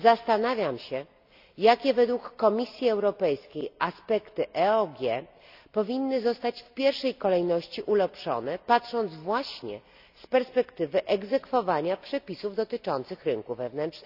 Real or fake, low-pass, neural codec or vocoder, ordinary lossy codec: real; 5.4 kHz; none; none